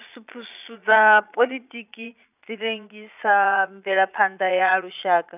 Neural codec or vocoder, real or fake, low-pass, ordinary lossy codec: vocoder, 22.05 kHz, 80 mel bands, Vocos; fake; 3.6 kHz; none